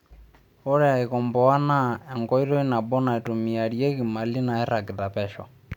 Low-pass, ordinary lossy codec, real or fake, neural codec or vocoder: 19.8 kHz; none; real; none